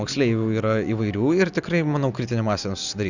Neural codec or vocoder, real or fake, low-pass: none; real; 7.2 kHz